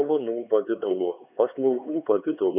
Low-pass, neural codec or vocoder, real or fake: 3.6 kHz; codec, 16 kHz, 4 kbps, X-Codec, HuBERT features, trained on LibriSpeech; fake